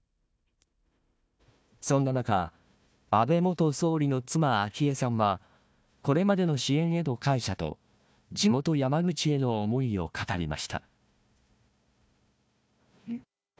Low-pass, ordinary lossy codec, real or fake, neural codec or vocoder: none; none; fake; codec, 16 kHz, 1 kbps, FunCodec, trained on Chinese and English, 50 frames a second